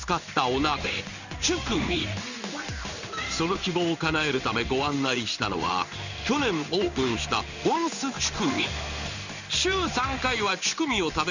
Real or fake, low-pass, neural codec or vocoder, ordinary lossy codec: fake; 7.2 kHz; codec, 16 kHz in and 24 kHz out, 1 kbps, XY-Tokenizer; none